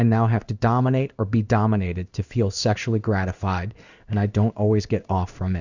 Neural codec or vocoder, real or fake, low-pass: codec, 16 kHz in and 24 kHz out, 1 kbps, XY-Tokenizer; fake; 7.2 kHz